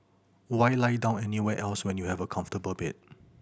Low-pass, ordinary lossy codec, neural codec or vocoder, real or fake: none; none; none; real